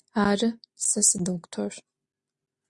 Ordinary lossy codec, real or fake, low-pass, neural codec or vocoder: Opus, 64 kbps; real; 10.8 kHz; none